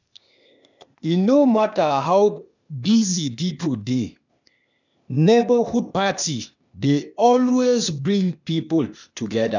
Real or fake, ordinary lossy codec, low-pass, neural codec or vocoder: fake; none; 7.2 kHz; codec, 16 kHz, 0.8 kbps, ZipCodec